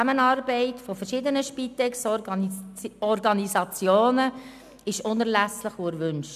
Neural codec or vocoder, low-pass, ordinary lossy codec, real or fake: none; 14.4 kHz; none; real